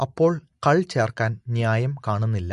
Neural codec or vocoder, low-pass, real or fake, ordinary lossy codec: none; 14.4 kHz; real; MP3, 48 kbps